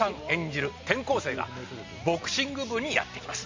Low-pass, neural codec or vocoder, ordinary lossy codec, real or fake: 7.2 kHz; none; MP3, 48 kbps; real